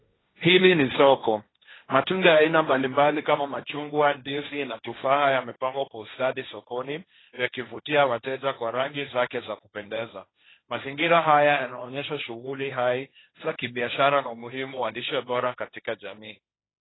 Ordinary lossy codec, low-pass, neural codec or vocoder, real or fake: AAC, 16 kbps; 7.2 kHz; codec, 16 kHz, 1.1 kbps, Voila-Tokenizer; fake